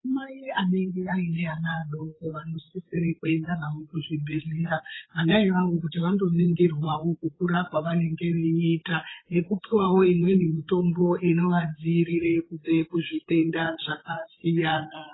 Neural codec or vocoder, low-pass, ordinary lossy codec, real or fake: codec, 16 kHz, 8 kbps, FreqCodec, larger model; 7.2 kHz; AAC, 16 kbps; fake